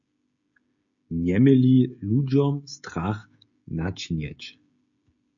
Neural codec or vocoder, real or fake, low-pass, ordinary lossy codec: codec, 16 kHz, 16 kbps, FreqCodec, smaller model; fake; 7.2 kHz; AAC, 64 kbps